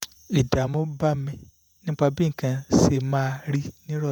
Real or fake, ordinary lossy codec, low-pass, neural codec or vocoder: fake; none; none; vocoder, 48 kHz, 128 mel bands, Vocos